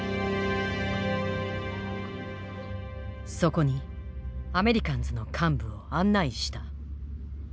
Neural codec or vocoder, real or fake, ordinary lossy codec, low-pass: none; real; none; none